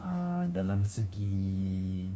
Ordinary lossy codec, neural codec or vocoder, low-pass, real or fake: none; codec, 16 kHz, 1 kbps, FunCodec, trained on LibriTTS, 50 frames a second; none; fake